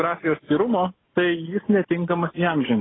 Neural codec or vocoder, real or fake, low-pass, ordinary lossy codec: none; real; 7.2 kHz; AAC, 16 kbps